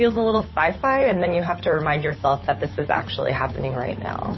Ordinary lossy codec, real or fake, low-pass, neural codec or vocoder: MP3, 24 kbps; fake; 7.2 kHz; codec, 16 kHz, 8 kbps, FunCodec, trained on Chinese and English, 25 frames a second